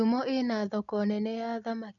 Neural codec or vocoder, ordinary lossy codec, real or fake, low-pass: none; none; real; 7.2 kHz